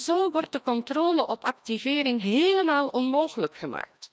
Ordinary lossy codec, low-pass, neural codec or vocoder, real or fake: none; none; codec, 16 kHz, 1 kbps, FreqCodec, larger model; fake